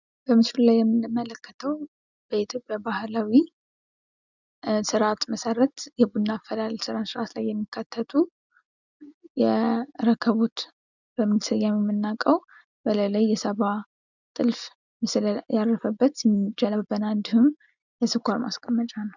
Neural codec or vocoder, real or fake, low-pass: none; real; 7.2 kHz